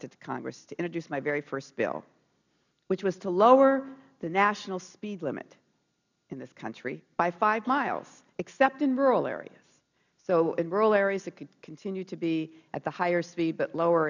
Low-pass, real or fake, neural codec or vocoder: 7.2 kHz; real; none